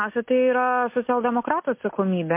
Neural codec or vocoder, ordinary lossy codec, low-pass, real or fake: none; MP3, 24 kbps; 3.6 kHz; real